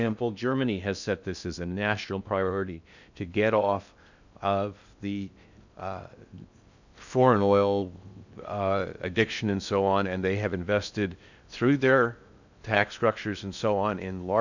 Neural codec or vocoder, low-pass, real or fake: codec, 16 kHz in and 24 kHz out, 0.6 kbps, FocalCodec, streaming, 2048 codes; 7.2 kHz; fake